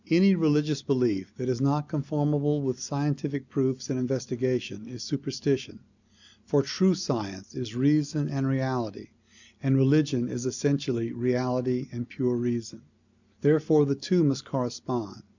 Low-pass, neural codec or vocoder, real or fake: 7.2 kHz; none; real